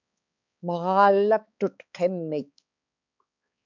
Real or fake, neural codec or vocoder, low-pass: fake; codec, 16 kHz, 4 kbps, X-Codec, HuBERT features, trained on balanced general audio; 7.2 kHz